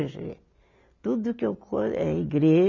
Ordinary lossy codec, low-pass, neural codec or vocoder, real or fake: none; 7.2 kHz; none; real